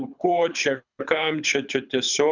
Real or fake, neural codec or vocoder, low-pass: fake; codec, 24 kHz, 6 kbps, HILCodec; 7.2 kHz